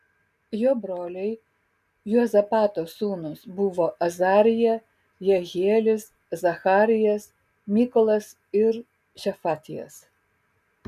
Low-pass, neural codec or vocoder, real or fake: 14.4 kHz; none; real